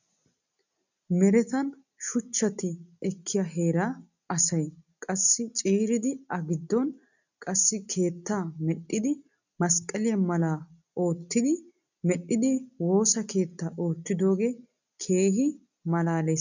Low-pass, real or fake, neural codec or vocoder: 7.2 kHz; real; none